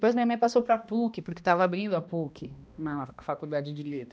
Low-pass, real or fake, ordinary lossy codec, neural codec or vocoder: none; fake; none; codec, 16 kHz, 1 kbps, X-Codec, HuBERT features, trained on balanced general audio